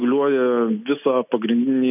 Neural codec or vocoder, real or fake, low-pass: none; real; 3.6 kHz